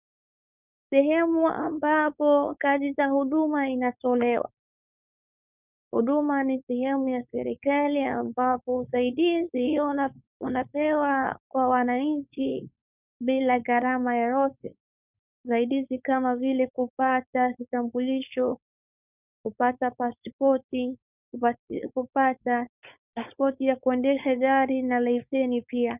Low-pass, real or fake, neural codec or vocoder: 3.6 kHz; fake; codec, 16 kHz, 4.8 kbps, FACodec